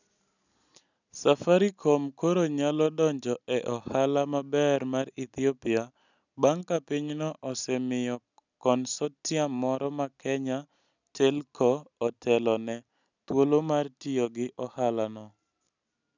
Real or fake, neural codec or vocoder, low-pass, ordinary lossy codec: real; none; 7.2 kHz; none